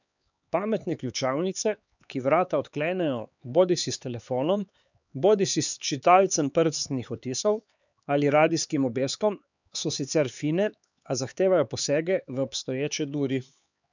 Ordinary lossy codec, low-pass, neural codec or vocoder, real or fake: none; 7.2 kHz; codec, 16 kHz, 4 kbps, X-Codec, HuBERT features, trained on LibriSpeech; fake